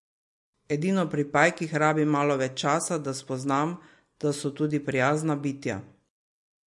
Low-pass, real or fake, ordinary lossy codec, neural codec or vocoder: 10.8 kHz; real; MP3, 48 kbps; none